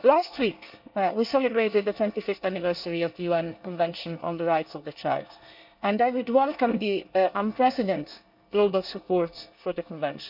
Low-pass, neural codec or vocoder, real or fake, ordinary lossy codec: 5.4 kHz; codec, 24 kHz, 1 kbps, SNAC; fake; none